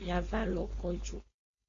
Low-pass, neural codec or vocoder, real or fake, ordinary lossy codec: 7.2 kHz; codec, 16 kHz, 4.8 kbps, FACodec; fake; AAC, 32 kbps